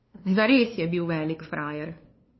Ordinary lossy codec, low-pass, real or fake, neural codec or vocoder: MP3, 24 kbps; 7.2 kHz; fake; codec, 16 kHz, 2 kbps, FunCodec, trained on LibriTTS, 25 frames a second